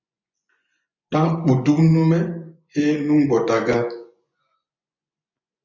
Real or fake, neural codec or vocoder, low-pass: fake; vocoder, 24 kHz, 100 mel bands, Vocos; 7.2 kHz